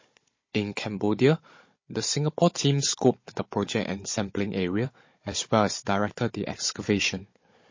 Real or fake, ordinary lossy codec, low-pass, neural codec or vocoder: fake; MP3, 32 kbps; 7.2 kHz; codec, 16 kHz, 16 kbps, FunCodec, trained on Chinese and English, 50 frames a second